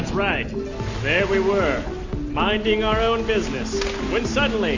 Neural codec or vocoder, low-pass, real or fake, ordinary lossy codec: none; 7.2 kHz; real; AAC, 48 kbps